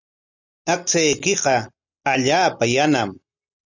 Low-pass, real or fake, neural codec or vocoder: 7.2 kHz; real; none